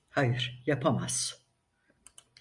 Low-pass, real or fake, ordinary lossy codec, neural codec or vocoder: 10.8 kHz; real; Opus, 64 kbps; none